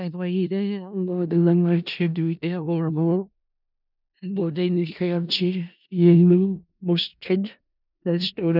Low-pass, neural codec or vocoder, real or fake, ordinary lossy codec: 5.4 kHz; codec, 16 kHz in and 24 kHz out, 0.4 kbps, LongCat-Audio-Codec, four codebook decoder; fake; none